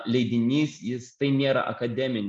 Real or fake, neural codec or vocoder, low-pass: real; none; 10.8 kHz